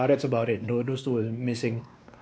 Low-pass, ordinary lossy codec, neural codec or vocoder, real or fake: none; none; codec, 16 kHz, 2 kbps, X-Codec, WavLM features, trained on Multilingual LibriSpeech; fake